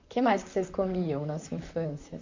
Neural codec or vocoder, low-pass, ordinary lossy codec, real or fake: vocoder, 44.1 kHz, 128 mel bands, Pupu-Vocoder; 7.2 kHz; AAC, 48 kbps; fake